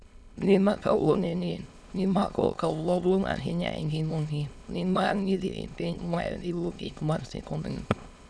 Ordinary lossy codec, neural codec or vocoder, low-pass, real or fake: none; autoencoder, 22.05 kHz, a latent of 192 numbers a frame, VITS, trained on many speakers; none; fake